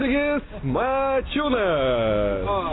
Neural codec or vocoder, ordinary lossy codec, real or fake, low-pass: none; AAC, 16 kbps; real; 7.2 kHz